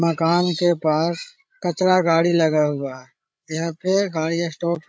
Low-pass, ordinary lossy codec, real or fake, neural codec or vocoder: none; none; fake; codec, 16 kHz, 8 kbps, FreqCodec, larger model